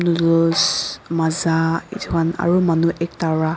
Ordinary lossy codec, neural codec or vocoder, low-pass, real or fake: none; none; none; real